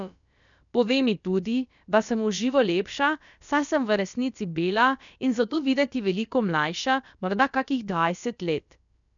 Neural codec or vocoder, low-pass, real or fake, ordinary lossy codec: codec, 16 kHz, about 1 kbps, DyCAST, with the encoder's durations; 7.2 kHz; fake; none